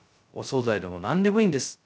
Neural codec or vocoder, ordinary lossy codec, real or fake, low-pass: codec, 16 kHz, 0.2 kbps, FocalCodec; none; fake; none